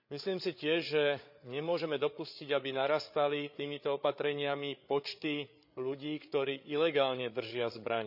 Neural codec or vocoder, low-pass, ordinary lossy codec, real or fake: codec, 16 kHz, 8 kbps, FreqCodec, larger model; 5.4 kHz; none; fake